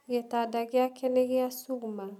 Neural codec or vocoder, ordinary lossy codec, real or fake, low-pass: none; none; real; 19.8 kHz